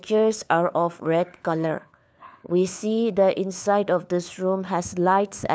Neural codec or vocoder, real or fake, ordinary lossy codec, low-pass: codec, 16 kHz, 4 kbps, FunCodec, trained on LibriTTS, 50 frames a second; fake; none; none